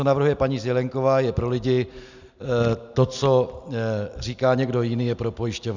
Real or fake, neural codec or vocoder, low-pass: real; none; 7.2 kHz